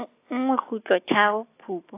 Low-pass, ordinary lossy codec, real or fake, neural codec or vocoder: 3.6 kHz; AAC, 24 kbps; real; none